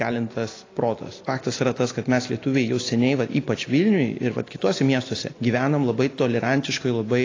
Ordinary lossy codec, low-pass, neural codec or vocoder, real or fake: AAC, 32 kbps; 7.2 kHz; none; real